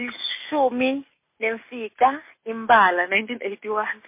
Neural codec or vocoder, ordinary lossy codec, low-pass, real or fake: none; MP3, 24 kbps; 3.6 kHz; real